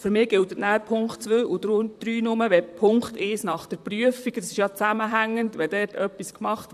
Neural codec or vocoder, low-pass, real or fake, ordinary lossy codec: vocoder, 44.1 kHz, 128 mel bands, Pupu-Vocoder; 14.4 kHz; fake; none